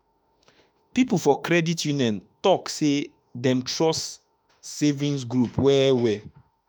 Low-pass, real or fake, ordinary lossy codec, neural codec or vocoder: none; fake; none; autoencoder, 48 kHz, 32 numbers a frame, DAC-VAE, trained on Japanese speech